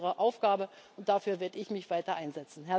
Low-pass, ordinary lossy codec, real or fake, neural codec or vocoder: none; none; real; none